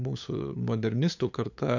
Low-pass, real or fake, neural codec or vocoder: 7.2 kHz; fake; codec, 16 kHz, 8 kbps, FunCodec, trained on LibriTTS, 25 frames a second